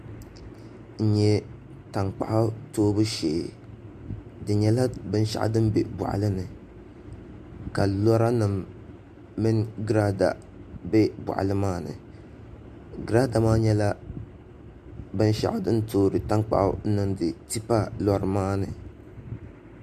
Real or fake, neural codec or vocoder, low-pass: real; none; 14.4 kHz